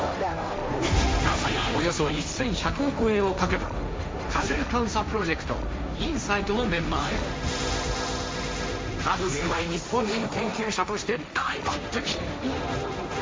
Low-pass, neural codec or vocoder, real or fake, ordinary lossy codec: none; codec, 16 kHz, 1.1 kbps, Voila-Tokenizer; fake; none